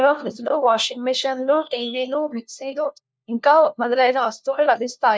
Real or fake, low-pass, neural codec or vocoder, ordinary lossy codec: fake; none; codec, 16 kHz, 1 kbps, FunCodec, trained on LibriTTS, 50 frames a second; none